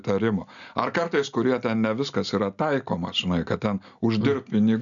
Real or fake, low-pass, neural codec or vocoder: real; 7.2 kHz; none